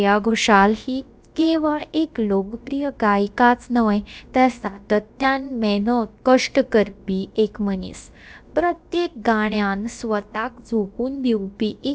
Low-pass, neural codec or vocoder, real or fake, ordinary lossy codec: none; codec, 16 kHz, about 1 kbps, DyCAST, with the encoder's durations; fake; none